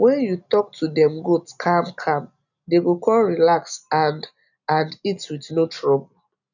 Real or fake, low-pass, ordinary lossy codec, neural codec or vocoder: real; 7.2 kHz; none; none